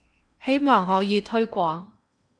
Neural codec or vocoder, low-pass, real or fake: codec, 16 kHz in and 24 kHz out, 0.8 kbps, FocalCodec, streaming, 65536 codes; 9.9 kHz; fake